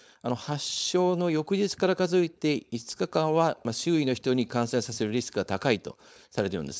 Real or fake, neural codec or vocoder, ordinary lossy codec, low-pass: fake; codec, 16 kHz, 4.8 kbps, FACodec; none; none